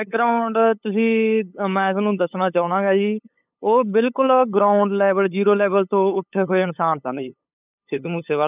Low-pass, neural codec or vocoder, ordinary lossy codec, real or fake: 3.6 kHz; codec, 16 kHz, 8 kbps, FunCodec, trained on LibriTTS, 25 frames a second; none; fake